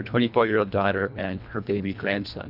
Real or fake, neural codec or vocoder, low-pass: fake; codec, 24 kHz, 1.5 kbps, HILCodec; 5.4 kHz